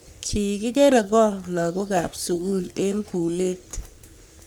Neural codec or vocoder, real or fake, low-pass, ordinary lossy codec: codec, 44.1 kHz, 3.4 kbps, Pupu-Codec; fake; none; none